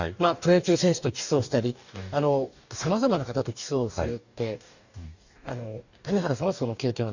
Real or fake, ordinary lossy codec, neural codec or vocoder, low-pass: fake; none; codec, 44.1 kHz, 2.6 kbps, DAC; 7.2 kHz